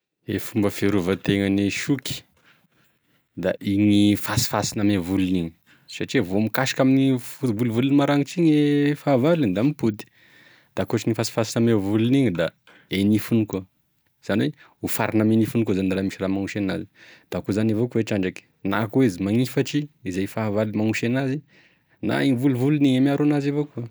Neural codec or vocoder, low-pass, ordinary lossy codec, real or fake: none; none; none; real